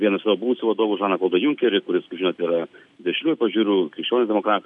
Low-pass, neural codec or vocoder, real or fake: 10.8 kHz; none; real